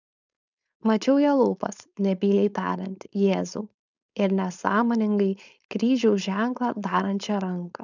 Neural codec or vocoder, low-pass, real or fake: codec, 16 kHz, 4.8 kbps, FACodec; 7.2 kHz; fake